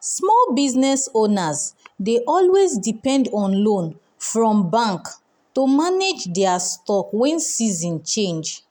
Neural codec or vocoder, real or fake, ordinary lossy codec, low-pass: none; real; none; none